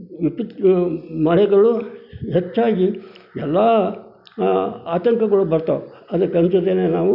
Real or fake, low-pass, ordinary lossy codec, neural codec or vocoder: real; 5.4 kHz; none; none